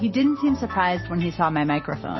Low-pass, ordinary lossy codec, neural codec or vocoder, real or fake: 7.2 kHz; MP3, 24 kbps; none; real